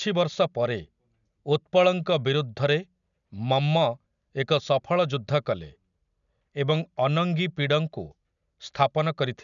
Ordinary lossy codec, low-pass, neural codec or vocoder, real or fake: none; 7.2 kHz; none; real